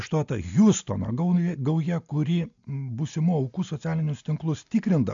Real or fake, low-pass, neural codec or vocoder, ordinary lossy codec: real; 7.2 kHz; none; AAC, 64 kbps